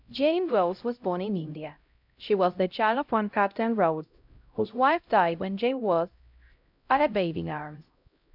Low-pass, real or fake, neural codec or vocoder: 5.4 kHz; fake; codec, 16 kHz, 0.5 kbps, X-Codec, HuBERT features, trained on LibriSpeech